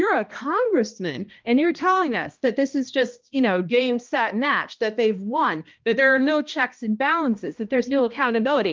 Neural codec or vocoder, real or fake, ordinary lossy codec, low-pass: codec, 16 kHz, 1 kbps, X-Codec, HuBERT features, trained on balanced general audio; fake; Opus, 32 kbps; 7.2 kHz